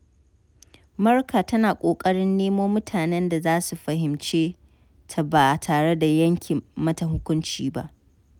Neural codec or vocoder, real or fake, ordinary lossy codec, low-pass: none; real; none; 19.8 kHz